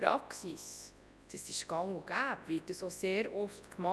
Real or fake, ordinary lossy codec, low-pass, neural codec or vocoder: fake; none; none; codec, 24 kHz, 0.9 kbps, WavTokenizer, large speech release